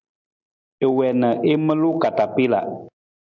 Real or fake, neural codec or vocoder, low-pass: real; none; 7.2 kHz